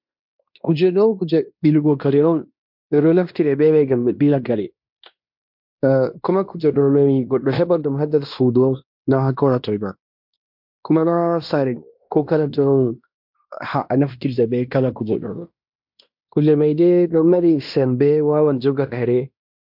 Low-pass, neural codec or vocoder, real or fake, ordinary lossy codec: 5.4 kHz; codec, 16 kHz in and 24 kHz out, 0.9 kbps, LongCat-Audio-Codec, fine tuned four codebook decoder; fake; MP3, 48 kbps